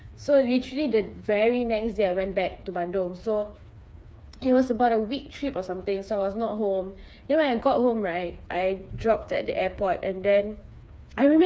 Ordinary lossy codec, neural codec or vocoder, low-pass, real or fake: none; codec, 16 kHz, 4 kbps, FreqCodec, smaller model; none; fake